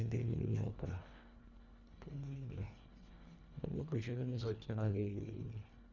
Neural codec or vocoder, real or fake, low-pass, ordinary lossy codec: codec, 24 kHz, 1.5 kbps, HILCodec; fake; 7.2 kHz; Opus, 64 kbps